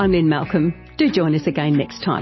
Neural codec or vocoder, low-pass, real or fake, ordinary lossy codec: none; 7.2 kHz; real; MP3, 24 kbps